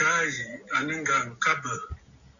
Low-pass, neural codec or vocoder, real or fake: 7.2 kHz; none; real